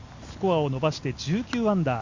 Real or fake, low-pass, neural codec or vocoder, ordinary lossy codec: real; 7.2 kHz; none; Opus, 64 kbps